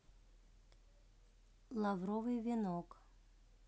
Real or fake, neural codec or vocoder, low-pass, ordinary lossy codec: real; none; none; none